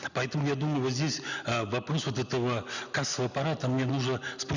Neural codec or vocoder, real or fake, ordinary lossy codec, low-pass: none; real; none; 7.2 kHz